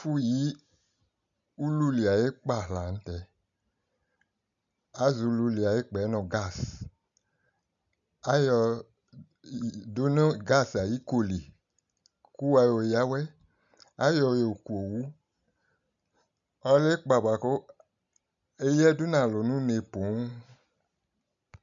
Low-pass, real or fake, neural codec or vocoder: 7.2 kHz; real; none